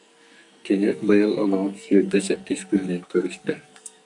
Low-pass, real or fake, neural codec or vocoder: 10.8 kHz; fake; codec, 44.1 kHz, 2.6 kbps, SNAC